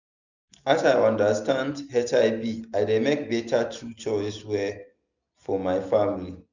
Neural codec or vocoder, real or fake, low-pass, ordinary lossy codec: none; real; 7.2 kHz; none